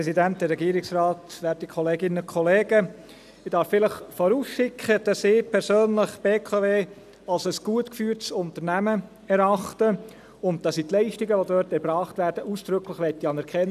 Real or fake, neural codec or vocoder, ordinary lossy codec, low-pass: real; none; none; 14.4 kHz